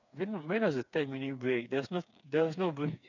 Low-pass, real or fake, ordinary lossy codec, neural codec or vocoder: 7.2 kHz; fake; none; codec, 16 kHz, 4 kbps, FreqCodec, smaller model